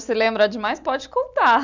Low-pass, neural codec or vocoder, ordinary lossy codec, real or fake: 7.2 kHz; none; none; real